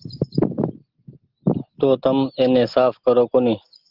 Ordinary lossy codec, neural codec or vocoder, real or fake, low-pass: Opus, 16 kbps; none; real; 5.4 kHz